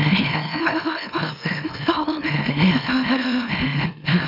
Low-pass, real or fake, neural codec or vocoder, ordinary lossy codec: 5.4 kHz; fake; autoencoder, 44.1 kHz, a latent of 192 numbers a frame, MeloTTS; none